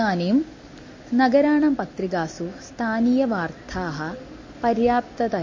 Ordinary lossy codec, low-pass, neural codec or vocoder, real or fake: MP3, 32 kbps; 7.2 kHz; none; real